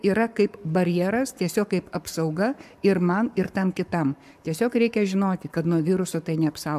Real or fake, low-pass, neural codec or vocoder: fake; 14.4 kHz; codec, 44.1 kHz, 7.8 kbps, Pupu-Codec